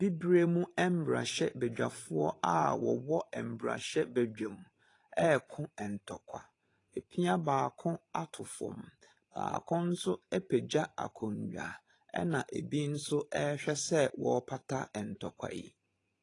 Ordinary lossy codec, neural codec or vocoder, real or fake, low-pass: AAC, 32 kbps; vocoder, 44.1 kHz, 128 mel bands every 512 samples, BigVGAN v2; fake; 10.8 kHz